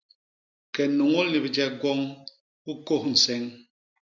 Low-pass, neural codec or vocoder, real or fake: 7.2 kHz; none; real